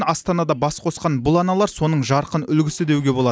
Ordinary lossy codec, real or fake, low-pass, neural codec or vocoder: none; real; none; none